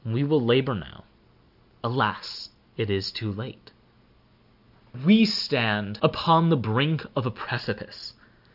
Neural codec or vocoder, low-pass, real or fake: none; 5.4 kHz; real